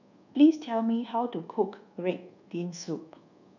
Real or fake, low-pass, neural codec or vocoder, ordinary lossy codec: fake; 7.2 kHz; codec, 24 kHz, 1.2 kbps, DualCodec; none